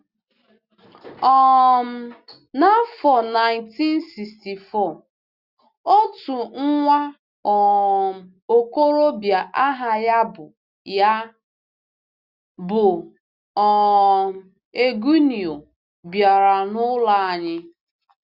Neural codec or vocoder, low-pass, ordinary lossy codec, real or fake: none; 5.4 kHz; Opus, 64 kbps; real